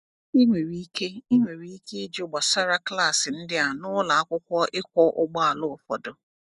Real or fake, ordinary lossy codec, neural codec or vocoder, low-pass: real; none; none; 10.8 kHz